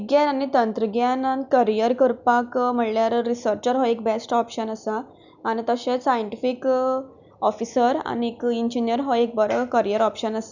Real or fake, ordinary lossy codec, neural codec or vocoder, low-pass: real; none; none; 7.2 kHz